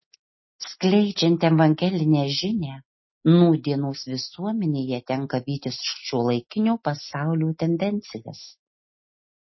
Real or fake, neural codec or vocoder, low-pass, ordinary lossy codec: real; none; 7.2 kHz; MP3, 24 kbps